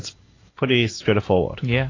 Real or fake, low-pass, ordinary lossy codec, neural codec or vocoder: real; 7.2 kHz; AAC, 32 kbps; none